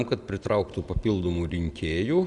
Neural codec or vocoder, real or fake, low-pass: none; real; 10.8 kHz